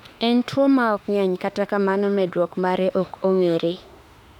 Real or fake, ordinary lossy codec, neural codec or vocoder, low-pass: fake; none; autoencoder, 48 kHz, 32 numbers a frame, DAC-VAE, trained on Japanese speech; 19.8 kHz